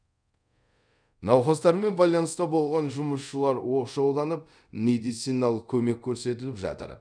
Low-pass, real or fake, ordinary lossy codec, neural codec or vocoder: 9.9 kHz; fake; none; codec, 24 kHz, 0.5 kbps, DualCodec